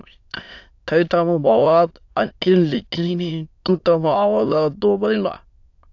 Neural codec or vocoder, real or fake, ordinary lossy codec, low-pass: autoencoder, 22.05 kHz, a latent of 192 numbers a frame, VITS, trained on many speakers; fake; AAC, 48 kbps; 7.2 kHz